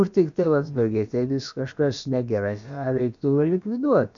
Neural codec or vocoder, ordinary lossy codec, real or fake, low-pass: codec, 16 kHz, about 1 kbps, DyCAST, with the encoder's durations; MP3, 64 kbps; fake; 7.2 kHz